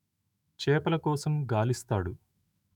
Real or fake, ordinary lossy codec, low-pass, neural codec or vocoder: fake; none; 19.8 kHz; autoencoder, 48 kHz, 128 numbers a frame, DAC-VAE, trained on Japanese speech